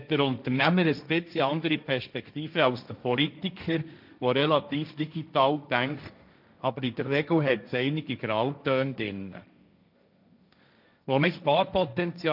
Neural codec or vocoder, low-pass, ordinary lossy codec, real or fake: codec, 16 kHz, 1.1 kbps, Voila-Tokenizer; 5.4 kHz; none; fake